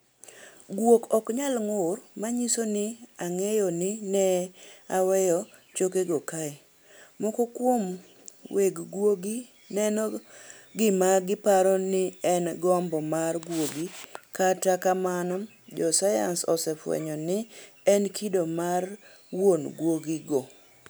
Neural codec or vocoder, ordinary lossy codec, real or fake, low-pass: none; none; real; none